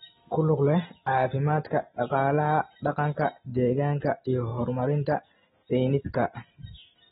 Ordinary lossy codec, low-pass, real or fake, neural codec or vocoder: AAC, 16 kbps; 19.8 kHz; real; none